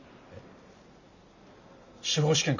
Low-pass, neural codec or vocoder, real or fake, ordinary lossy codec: 7.2 kHz; none; real; none